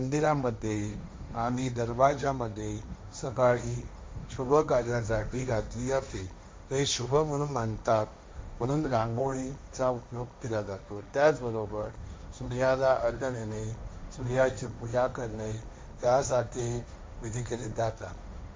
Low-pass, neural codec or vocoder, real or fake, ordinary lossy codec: none; codec, 16 kHz, 1.1 kbps, Voila-Tokenizer; fake; none